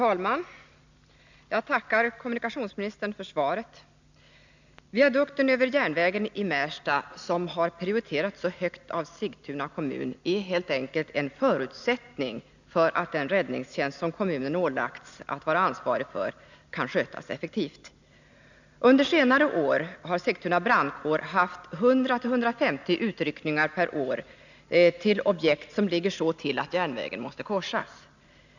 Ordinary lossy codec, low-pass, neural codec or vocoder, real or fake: none; 7.2 kHz; none; real